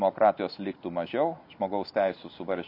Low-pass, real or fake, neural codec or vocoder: 5.4 kHz; real; none